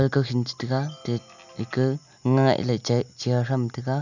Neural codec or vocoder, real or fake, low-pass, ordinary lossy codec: none; real; 7.2 kHz; none